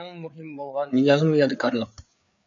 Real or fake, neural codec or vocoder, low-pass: fake; codec, 16 kHz, 4 kbps, FreqCodec, larger model; 7.2 kHz